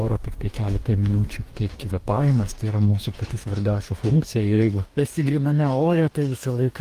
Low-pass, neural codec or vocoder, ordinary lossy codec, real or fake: 14.4 kHz; codec, 44.1 kHz, 2.6 kbps, DAC; Opus, 32 kbps; fake